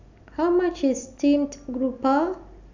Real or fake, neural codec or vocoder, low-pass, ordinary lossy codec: real; none; 7.2 kHz; none